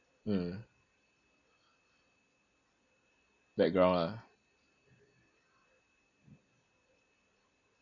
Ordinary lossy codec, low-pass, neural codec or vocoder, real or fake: none; 7.2 kHz; none; real